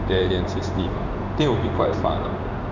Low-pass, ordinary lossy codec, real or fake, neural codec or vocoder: 7.2 kHz; none; fake; codec, 16 kHz in and 24 kHz out, 1 kbps, XY-Tokenizer